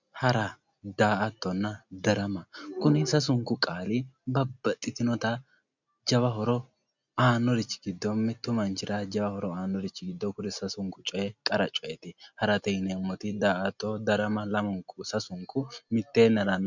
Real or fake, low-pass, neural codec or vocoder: real; 7.2 kHz; none